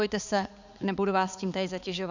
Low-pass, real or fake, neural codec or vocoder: 7.2 kHz; fake; codec, 16 kHz, 4 kbps, X-Codec, HuBERT features, trained on balanced general audio